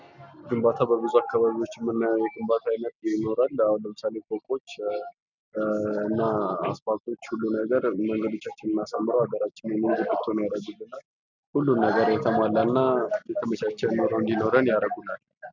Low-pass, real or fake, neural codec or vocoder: 7.2 kHz; real; none